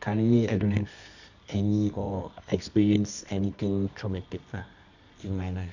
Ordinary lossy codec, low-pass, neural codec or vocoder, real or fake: none; 7.2 kHz; codec, 24 kHz, 0.9 kbps, WavTokenizer, medium music audio release; fake